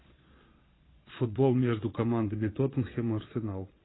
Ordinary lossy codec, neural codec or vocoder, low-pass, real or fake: AAC, 16 kbps; vocoder, 22.05 kHz, 80 mel bands, Vocos; 7.2 kHz; fake